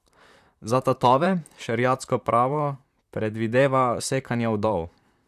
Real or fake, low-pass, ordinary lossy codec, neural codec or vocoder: fake; 14.4 kHz; none; vocoder, 44.1 kHz, 128 mel bands, Pupu-Vocoder